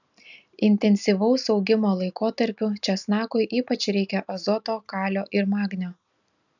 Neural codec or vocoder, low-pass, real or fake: none; 7.2 kHz; real